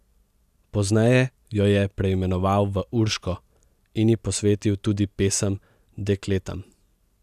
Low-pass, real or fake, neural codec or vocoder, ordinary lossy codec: 14.4 kHz; real; none; none